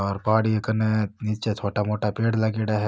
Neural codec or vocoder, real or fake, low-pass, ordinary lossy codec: none; real; none; none